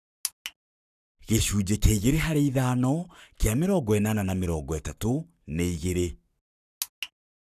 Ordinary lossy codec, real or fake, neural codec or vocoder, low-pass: none; fake; codec, 44.1 kHz, 7.8 kbps, Pupu-Codec; 14.4 kHz